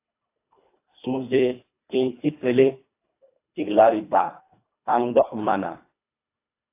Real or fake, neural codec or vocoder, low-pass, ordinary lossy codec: fake; codec, 24 kHz, 1.5 kbps, HILCodec; 3.6 kHz; AAC, 24 kbps